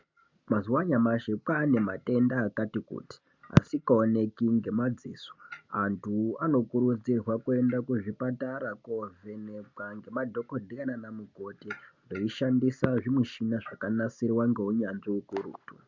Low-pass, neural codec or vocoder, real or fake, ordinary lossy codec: 7.2 kHz; none; real; MP3, 64 kbps